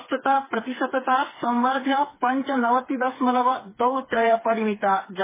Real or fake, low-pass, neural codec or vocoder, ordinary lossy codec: fake; 3.6 kHz; codec, 16 kHz, 4 kbps, FreqCodec, smaller model; MP3, 16 kbps